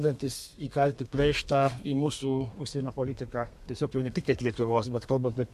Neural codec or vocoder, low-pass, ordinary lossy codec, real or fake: codec, 32 kHz, 1.9 kbps, SNAC; 14.4 kHz; MP3, 64 kbps; fake